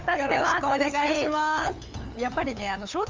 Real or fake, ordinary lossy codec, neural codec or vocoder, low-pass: fake; Opus, 32 kbps; codec, 16 kHz, 8 kbps, FunCodec, trained on LibriTTS, 25 frames a second; 7.2 kHz